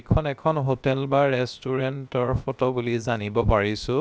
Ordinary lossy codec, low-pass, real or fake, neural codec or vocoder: none; none; fake; codec, 16 kHz, 0.7 kbps, FocalCodec